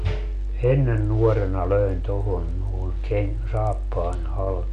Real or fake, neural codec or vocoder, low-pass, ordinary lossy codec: real; none; 9.9 kHz; none